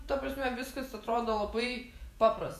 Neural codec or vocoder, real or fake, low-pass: vocoder, 48 kHz, 128 mel bands, Vocos; fake; 14.4 kHz